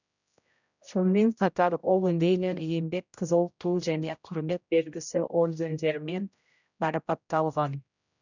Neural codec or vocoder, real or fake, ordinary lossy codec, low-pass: codec, 16 kHz, 0.5 kbps, X-Codec, HuBERT features, trained on general audio; fake; none; 7.2 kHz